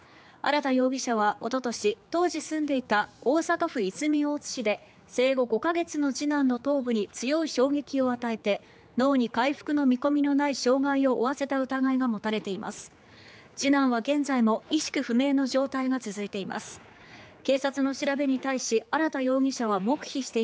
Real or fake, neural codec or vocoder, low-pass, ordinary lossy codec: fake; codec, 16 kHz, 4 kbps, X-Codec, HuBERT features, trained on general audio; none; none